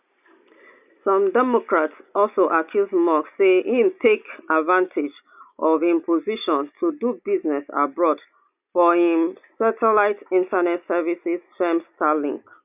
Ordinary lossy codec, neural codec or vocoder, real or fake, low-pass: none; none; real; 3.6 kHz